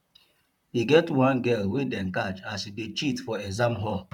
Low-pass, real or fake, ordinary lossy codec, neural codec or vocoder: 19.8 kHz; fake; none; vocoder, 44.1 kHz, 128 mel bands, Pupu-Vocoder